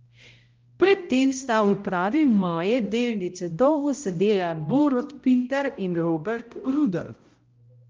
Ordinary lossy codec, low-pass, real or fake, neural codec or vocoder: Opus, 24 kbps; 7.2 kHz; fake; codec, 16 kHz, 0.5 kbps, X-Codec, HuBERT features, trained on balanced general audio